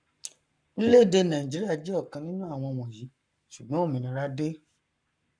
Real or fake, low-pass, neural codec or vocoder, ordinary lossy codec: fake; 9.9 kHz; codec, 44.1 kHz, 7.8 kbps, Pupu-Codec; none